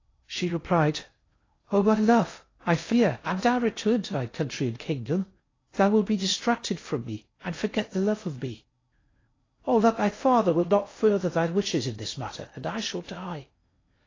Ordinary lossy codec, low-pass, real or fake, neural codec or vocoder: AAC, 32 kbps; 7.2 kHz; fake; codec, 16 kHz in and 24 kHz out, 0.6 kbps, FocalCodec, streaming, 2048 codes